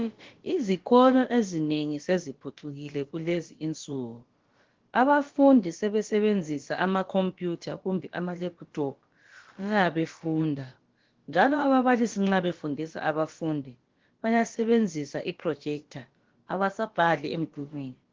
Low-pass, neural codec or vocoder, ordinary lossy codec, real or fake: 7.2 kHz; codec, 16 kHz, about 1 kbps, DyCAST, with the encoder's durations; Opus, 16 kbps; fake